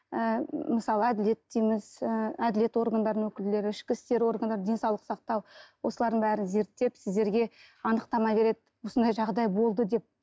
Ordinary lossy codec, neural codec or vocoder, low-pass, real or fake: none; none; none; real